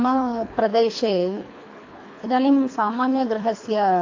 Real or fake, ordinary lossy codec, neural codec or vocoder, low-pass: fake; AAC, 48 kbps; codec, 24 kHz, 3 kbps, HILCodec; 7.2 kHz